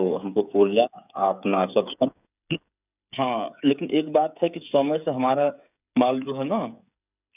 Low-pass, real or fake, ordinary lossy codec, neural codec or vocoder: 3.6 kHz; fake; none; codec, 16 kHz, 8 kbps, FreqCodec, smaller model